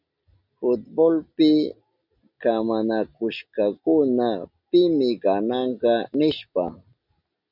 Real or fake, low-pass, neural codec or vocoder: real; 5.4 kHz; none